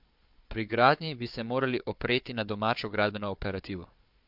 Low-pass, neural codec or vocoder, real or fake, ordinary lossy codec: 5.4 kHz; codec, 16 kHz, 4 kbps, FunCodec, trained on Chinese and English, 50 frames a second; fake; MP3, 48 kbps